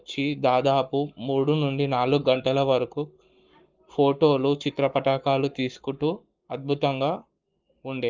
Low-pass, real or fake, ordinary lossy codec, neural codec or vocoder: 7.2 kHz; fake; Opus, 24 kbps; codec, 44.1 kHz, 7.8 kbps, Pupu-Codec